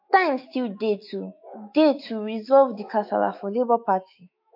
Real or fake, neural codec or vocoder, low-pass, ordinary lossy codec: fake; autoencoder, 48 kHz, 128 numbers a frame, DAC-VAE, trained on Japanese speech; 5.4 kHz; MP3, 32 kbps